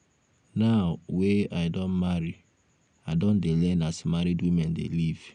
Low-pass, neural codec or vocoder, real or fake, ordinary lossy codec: 9.9 kHz; none; real; none